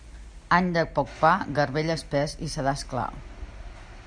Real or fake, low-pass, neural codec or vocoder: real; 9.9 kHz; none